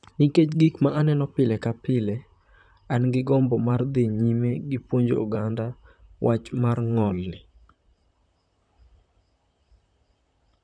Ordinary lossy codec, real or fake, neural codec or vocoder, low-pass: none; fake; vocoder, 44.1 kHz, 128 mel bands, Pupu-Vocoder; 9.9 kHz